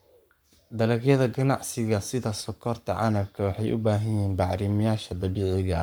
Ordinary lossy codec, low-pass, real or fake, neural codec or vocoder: none; none; fake; codec, 44.1 kHz, 7.8 kbps, Pupu-Codec